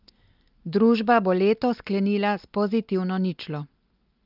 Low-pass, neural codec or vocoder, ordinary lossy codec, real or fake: 5.4 kHz; none; Opus, 24 kbps; real